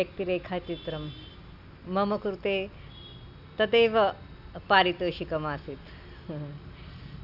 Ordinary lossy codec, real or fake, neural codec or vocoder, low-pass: none; real; none; 5.4 kHz